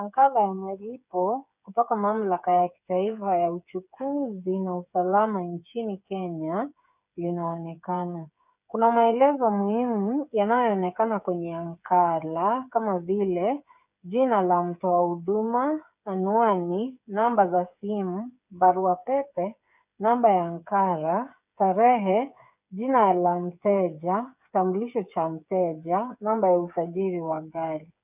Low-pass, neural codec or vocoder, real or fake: 3.6 kHz; codec, 16 kHz, 8 kbps, FreqCodec, smaller model; fake